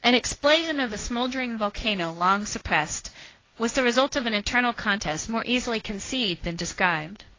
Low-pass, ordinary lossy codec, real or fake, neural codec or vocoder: 7.2 kHz; AAC, 32 kbps; fake; codec, 16 kHz, 1.1 kbps, Voila-Tokenizer